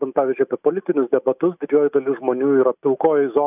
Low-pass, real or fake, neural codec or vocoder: 3.6 kHz; real; none